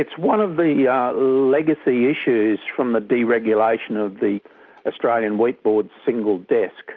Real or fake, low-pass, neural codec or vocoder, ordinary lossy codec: real; 7.2 kHz; none; Opus, 24 kbps